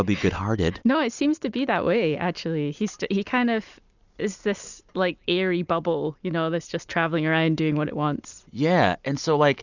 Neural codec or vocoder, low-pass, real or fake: vocoder, 44.1 kHz, 128 mel bands every 256 samples, BigVGAN v2; 7.2 kHz; fake